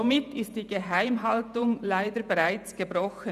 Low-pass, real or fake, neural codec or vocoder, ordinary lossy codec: 14.4 kHz; fake; vocoder, 48 kHz, 128 mel bands, Vocos; none